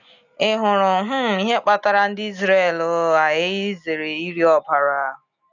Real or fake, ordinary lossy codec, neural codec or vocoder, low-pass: real; none; none; 7.2 kHz